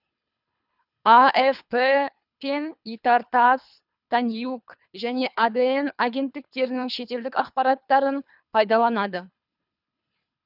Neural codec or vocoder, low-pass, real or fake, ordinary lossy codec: codec, 24 kHz, 3 kbps, HILCodec; 5.4 kHz; fake; none